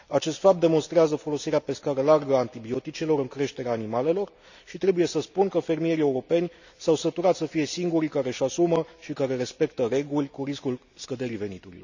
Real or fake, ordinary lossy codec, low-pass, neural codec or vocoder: real; none; 7.2 kHz; none